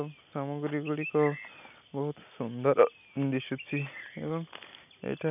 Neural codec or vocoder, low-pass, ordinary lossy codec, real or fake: none; 3.6 kHz; none; real